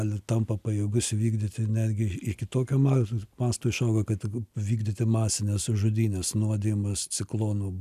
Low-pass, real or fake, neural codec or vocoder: 14.4 kHz; real; none